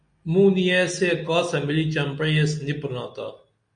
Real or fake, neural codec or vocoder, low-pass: real; none; 9.9 kHz